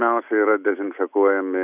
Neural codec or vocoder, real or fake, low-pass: none; real; 3.6 kHz